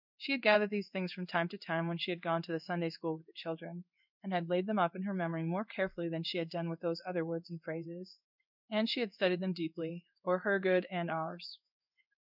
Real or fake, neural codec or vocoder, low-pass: fake; codec, 16 kHz in and 24 kHz out, 1 kbps, XY-Tokenizer; 5.4 kHz